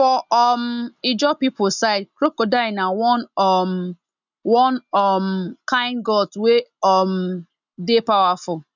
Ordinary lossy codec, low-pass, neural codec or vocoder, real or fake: none; 7.2 kHz; none; real